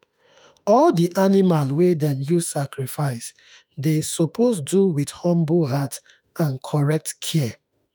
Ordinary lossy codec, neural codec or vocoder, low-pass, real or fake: none; autoencoder, 48 kHz, 32 numbers a frame, DAC-VAE, trained on Japanese speech; none; fake